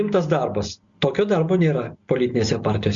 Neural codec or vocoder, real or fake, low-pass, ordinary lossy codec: none; real; 7.2 kHz; Opus, 64 kbps